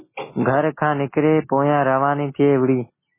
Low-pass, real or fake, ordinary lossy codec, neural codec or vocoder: 3.6 kHz; real; MP3, 16 kbps; none